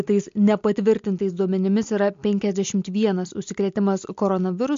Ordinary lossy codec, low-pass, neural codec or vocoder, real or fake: MP3, 48 kbps; 7.2 kHz; none; real